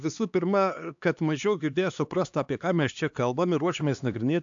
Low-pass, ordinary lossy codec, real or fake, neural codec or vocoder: 7.2 kHz; AAC, 64 kbps; fake; codec, 16 kHz, 2 kbps, X-Codec, HuBERT features, trained on LibriSpeech